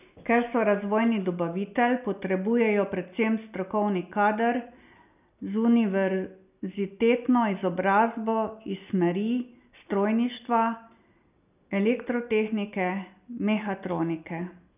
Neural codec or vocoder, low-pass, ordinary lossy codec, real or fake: none; 3.6 kHz; none; real